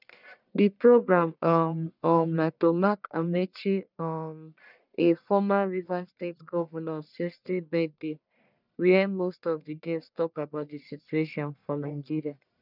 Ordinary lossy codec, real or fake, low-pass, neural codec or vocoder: none; fake; 5.4 kHz; codec, 44.1 kHz, 1.7 kbps, Pupu-Codec